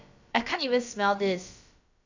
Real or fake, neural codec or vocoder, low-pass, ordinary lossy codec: fake; codec, 16 kHz, about 1 kbps, DyCAST, with the encoder's durations; 7.2 kHz; AAC, 48 kbps